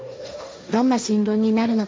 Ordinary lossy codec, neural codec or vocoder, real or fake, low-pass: none; codec, 16 kHz, 1.1 kbps, Voila-Tokenizer; fake; none